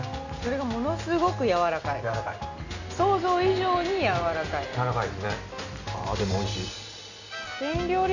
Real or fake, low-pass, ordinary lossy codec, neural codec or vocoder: real; 7.2 kHz; none; none